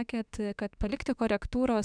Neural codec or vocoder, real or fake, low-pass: autoencoder, 48 kHz, 32 numbers a frame, DAC-VAE, trained on Japanese speech; fake; 9.9 kHz